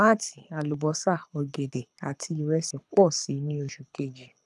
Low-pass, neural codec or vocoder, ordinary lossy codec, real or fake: none; codec, 24 kHz, 6 kbps, HILCodec; none; fake